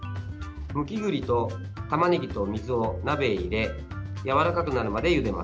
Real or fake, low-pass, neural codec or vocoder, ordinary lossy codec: real; none; none; none